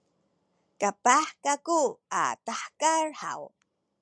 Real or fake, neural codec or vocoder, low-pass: real; none; 9.9 kHz